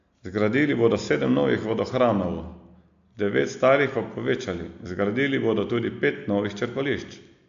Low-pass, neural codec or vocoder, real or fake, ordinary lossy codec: 7.2 kHz; none; real; AAC, 64 kbps